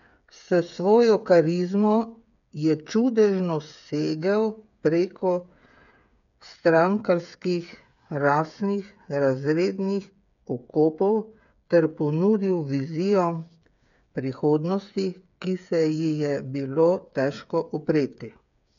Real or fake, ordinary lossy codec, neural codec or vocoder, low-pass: fake; none; codec, 16 kHz, 8 kbps, FreqCodec, smaller model; 7.2 kHz